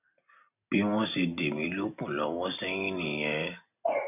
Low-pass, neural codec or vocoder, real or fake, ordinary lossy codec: 3.6 kHz; none; real; none